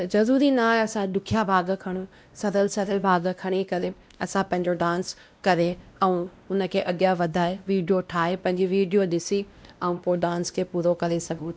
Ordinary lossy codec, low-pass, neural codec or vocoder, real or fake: none; none; codec, 16 kHz, 1 kbps, X-Codec, WavLM features, trained on Multilingual LibriSpeech; fake